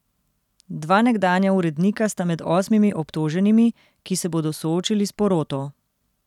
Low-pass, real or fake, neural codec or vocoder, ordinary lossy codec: 19.8 kHz; real; none; none